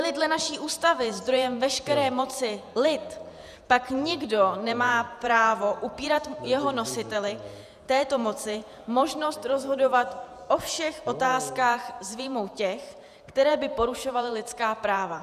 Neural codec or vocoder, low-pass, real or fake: vocoder, 48 kHz, 128 mel bands, Vocos; 14.4 kHz; fake